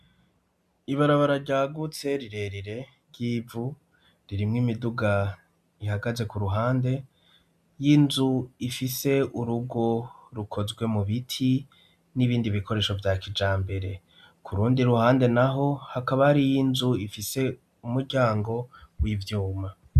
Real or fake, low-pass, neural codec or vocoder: real; 14.4 kHz; none